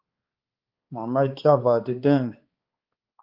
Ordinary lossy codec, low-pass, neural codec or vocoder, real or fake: Opus, 24 kbps; 5.4 kHz; codec, 16 kHz, 2 kbps, X-Codec, HuBERT features, trained on balanced general audio; fake